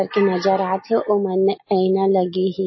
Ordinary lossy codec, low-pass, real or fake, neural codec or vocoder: MP3, 24 kbps; 7.2 kHz; real; none